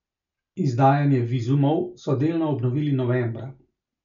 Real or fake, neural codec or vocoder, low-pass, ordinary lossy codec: real; none; 7.2 kHz; none